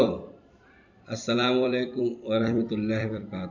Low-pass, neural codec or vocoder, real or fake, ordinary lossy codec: 7.2 kHz; vocoder, 44.1 kHz, 128 mel bands every 256 samples, BigVGAN v2; fake; none